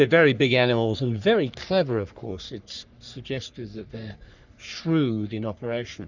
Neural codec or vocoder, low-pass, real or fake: codec, 44.1 kHz, 3.4 kbps, Pupu-Codec; 7.2 kHz; fake